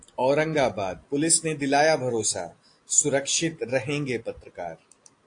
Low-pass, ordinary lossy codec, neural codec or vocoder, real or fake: 9.9 kHz; AAC, 48 kbps; none; real